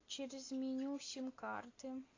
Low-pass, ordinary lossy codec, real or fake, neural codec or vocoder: 7.2 kHz; AAC, 32 kbps; real; none